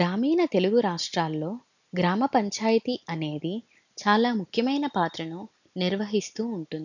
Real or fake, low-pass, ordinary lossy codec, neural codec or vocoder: real; 7.2 kHz; none; none